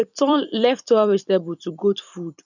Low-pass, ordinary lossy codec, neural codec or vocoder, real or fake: 7.2 kHz; none; vocoder, 44.1 kHz, 128 mel bands every 256 samples, BigVGAN v2; fake